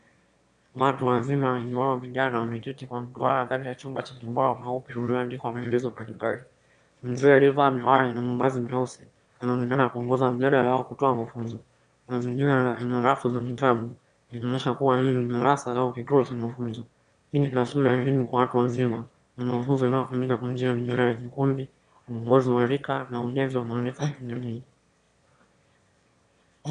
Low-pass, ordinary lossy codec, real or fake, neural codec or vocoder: 9.9 kHz; Opus, 64 kbps; fake; autoencoder, 22.05 kHz, a latent of 192 numbers a frame, VITS, trained on one speaker